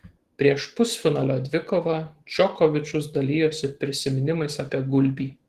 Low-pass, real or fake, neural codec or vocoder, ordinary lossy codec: 14.4 kHz; fake; autoencoder, 48 kHz, 128 numbers a frame, DAC-VAE, trained on Japanese speech; Opus, 16 kbps